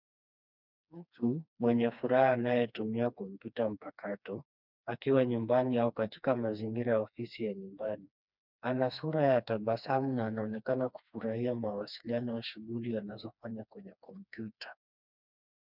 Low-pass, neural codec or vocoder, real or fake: 5.4 kHz; codec, 16 kHz, 2 kbps, FreqCodec, smaller model; fake